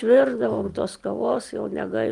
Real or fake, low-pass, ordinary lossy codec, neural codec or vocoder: real; 10.8 kHz; Opus, 32 kbps; none